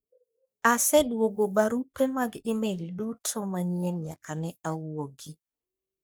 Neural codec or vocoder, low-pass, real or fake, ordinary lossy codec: codec, 44.1 kHz, 3.4 kbps, Pupu-Codec; none; fake; none